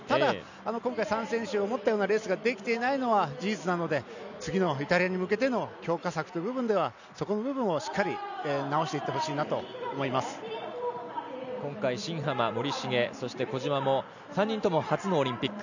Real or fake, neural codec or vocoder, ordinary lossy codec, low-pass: real; none; none; 7.2 kHz